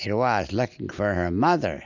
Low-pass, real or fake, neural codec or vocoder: 7.2 kHz; real; none